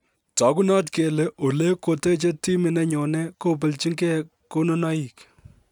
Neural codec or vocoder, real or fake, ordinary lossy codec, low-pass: none; real; none; 19.8 kHz